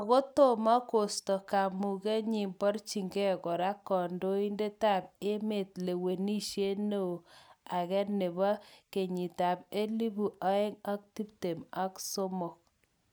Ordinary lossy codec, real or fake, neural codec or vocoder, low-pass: none; real; none; none